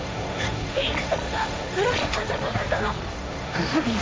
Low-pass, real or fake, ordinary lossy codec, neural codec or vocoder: none; fake; none; codec, 16 kHz, 1.1 kbps, Voila-Tokenizer